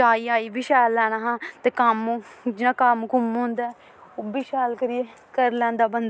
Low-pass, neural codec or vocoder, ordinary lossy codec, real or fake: none; none; none; real